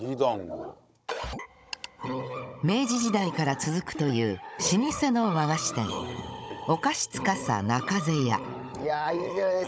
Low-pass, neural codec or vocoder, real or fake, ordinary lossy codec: none; codec, 16 kHz, 16 kbps, FunCodec, trained on Chinese and English, 50 frames a second; fake; none